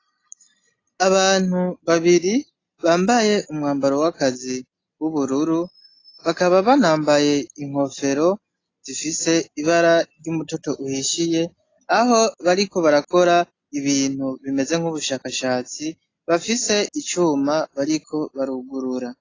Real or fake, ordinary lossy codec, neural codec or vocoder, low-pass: real; AAC, 32 kbps; none; 7.2 kHz